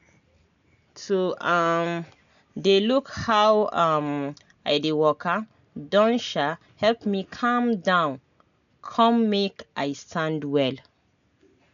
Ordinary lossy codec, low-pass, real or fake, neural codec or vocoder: none; 7.2 kHz; real; none